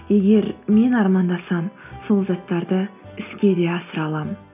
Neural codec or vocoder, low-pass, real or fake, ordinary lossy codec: none; 3.6 kHz; real; none